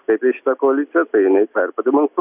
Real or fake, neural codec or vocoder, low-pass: real; none; 3.6 kHz